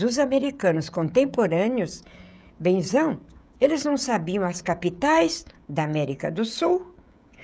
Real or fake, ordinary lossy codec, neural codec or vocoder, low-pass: fake; none; codec, 16 kHz, 16 kbps, FreqCodec, smaller model; none